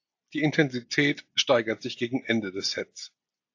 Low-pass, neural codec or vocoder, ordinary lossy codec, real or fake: 7.2 kHz; none; AAC, 48 kbps; real